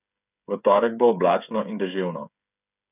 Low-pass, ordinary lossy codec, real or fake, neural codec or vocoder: 3.6 kHz; none; fake; codec, 16 kHz, 8 kbps, FreqCodec, smaller model